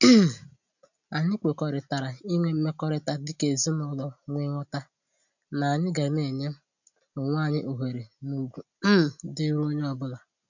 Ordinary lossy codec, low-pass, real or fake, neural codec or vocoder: none; 7.2 kHz; real; none